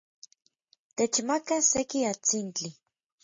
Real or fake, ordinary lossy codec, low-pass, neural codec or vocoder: real; MP3, 32 kbps; 7.2 kHz; none